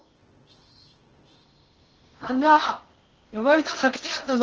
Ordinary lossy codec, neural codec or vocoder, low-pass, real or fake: Opus, 16 kbps; codec, 16 kHz in and 24 kHz out, 0.6 kbps, FocalCodec, streaming, 4096 codes; 7.2 kHz; fake